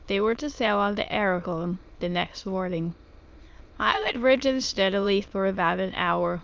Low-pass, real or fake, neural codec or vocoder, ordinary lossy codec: 7.2 kHz; fake; autoencoder, 22.05 kHz, a latent of 192 numbers a frame, VITS, trained on many speakers; Opus, 24 kbps